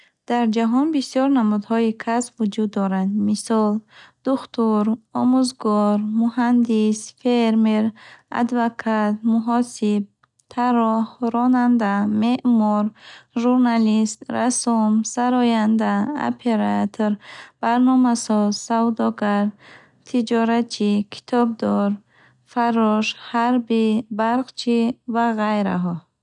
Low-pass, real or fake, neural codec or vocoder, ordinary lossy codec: 10.8 kHz; real; none; none